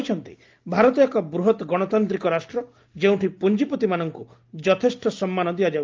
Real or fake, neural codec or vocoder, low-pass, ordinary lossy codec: real; none; 7.2 kHz; Opus, 32 kbps